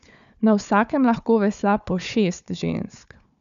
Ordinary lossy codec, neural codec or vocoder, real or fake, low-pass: none; codec, 16 kHz, 4 kbps, FunCodec, trained on Chinese and English, 50 frames a second; fake; 7.2 kHz